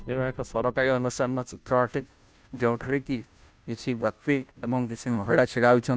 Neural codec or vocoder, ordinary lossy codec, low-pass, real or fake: codec, 16 kHz, 0.5 kbps, FunCodec, trained on Chinese and English, 25 frames a second; none; none; fake